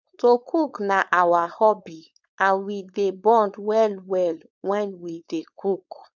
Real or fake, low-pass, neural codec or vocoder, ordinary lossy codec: fake; 7.2 kHz; codec, 16 kHz, 4.8 kbps, FACodec; none